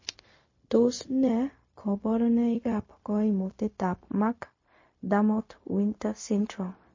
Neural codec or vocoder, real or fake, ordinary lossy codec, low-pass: codec, 16 kHz, 0.4 kbps, LongCat-Audio-Codec; fake; MP3, 32 kbps; 7.2 kHz